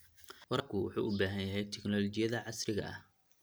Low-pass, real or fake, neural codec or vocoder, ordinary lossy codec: none; real; none; none